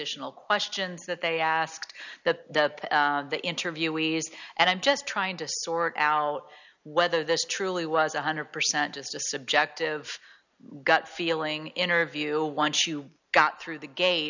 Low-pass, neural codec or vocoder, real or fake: 7.2 kHz; none; real